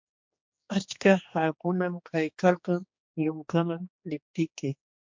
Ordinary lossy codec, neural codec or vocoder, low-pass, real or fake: MP3, 48 kbps; codec, 16 kHz, 2 kbps, X-Codec, HuBERT features, trained on general audio; 7.2 kHz; fake